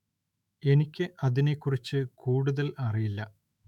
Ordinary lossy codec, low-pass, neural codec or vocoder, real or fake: none; 19.8 kHz; autoencoder, 48 kHz, 128 numbers a frame, DAC-VAE, trained on Japanese speech; fake